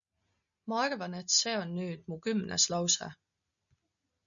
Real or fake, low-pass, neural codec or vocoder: real; 7.2 kHz; none